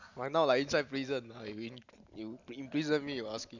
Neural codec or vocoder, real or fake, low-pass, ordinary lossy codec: none; real; 7.2 kHz; none